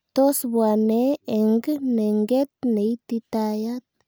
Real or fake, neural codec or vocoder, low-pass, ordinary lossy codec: real; none; none; none